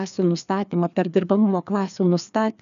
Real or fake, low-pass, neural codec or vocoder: fake; 7.2 kHz; codec, 16 kHz, 2 kbps, FreqCodec, larger model